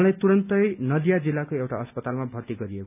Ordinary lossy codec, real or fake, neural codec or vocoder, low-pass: none; real; none; 3.6 kHz